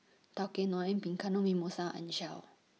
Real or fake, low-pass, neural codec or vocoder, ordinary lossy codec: real; none; none; none